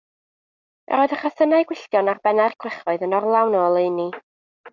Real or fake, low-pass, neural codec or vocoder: real; 7.2 kHz; none